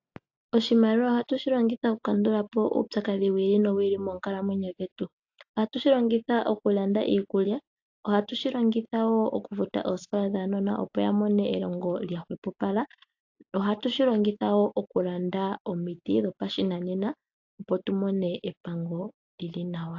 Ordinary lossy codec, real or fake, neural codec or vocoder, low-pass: AAC, 48 kbps; real; none; 7.2 kHz